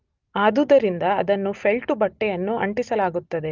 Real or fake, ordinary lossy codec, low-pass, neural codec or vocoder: real; Opus, 24 kbps; 7.2 kHz; none